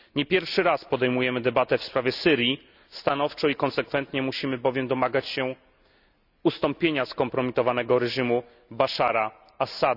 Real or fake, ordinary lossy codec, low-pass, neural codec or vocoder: real; none; 5.4 kHz; none